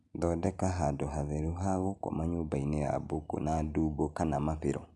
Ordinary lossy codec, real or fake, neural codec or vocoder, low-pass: none; real; none; 10.8 kHz